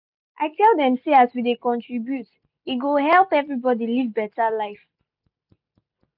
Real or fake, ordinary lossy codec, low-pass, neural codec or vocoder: real; none; 5.4 kHz; none